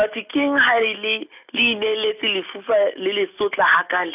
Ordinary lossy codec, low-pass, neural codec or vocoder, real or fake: none; 3.6 kHz; none; real